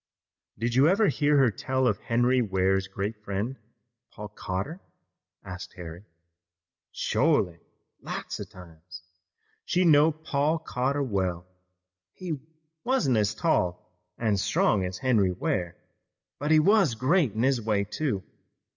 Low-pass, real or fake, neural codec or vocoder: 7.2 kHz; real; none